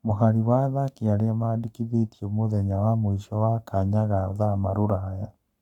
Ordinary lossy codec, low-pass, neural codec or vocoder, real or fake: none; 19.8 kHz; codec, 44.1 kHz, 7.8 kbps, Pupu-Codec; fake